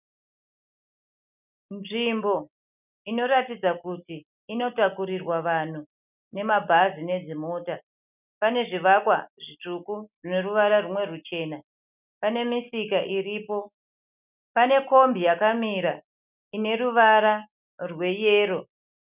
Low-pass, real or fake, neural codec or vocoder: 3.6 kHz; real; none